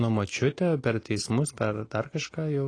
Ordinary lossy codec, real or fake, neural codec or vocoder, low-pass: AAC, 32 kbps; real; none; 9.9 kHz